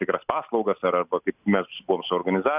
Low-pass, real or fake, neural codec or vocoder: 3.6 kHz; real; none